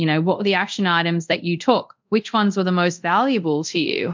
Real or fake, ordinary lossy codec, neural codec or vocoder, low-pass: fake; MP3, 64 kbps; codec, 24 kHz, 0.5 kbps, DualCodec; 7.2 kHz